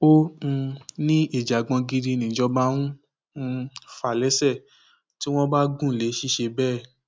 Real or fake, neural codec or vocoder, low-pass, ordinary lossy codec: real; none; none; none